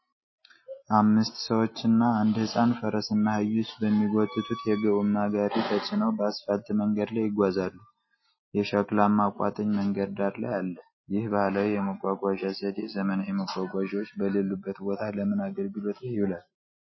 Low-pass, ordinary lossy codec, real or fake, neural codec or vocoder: 7.2 kHz; MP3, 24 kbps; real; none